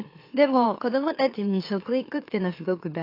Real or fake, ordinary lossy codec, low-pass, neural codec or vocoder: fake; AAC, 32 kbps; 5.4 kHz; autoencoder, 44.1 kHz, a latent of 192 numbers a frame, MeloTTS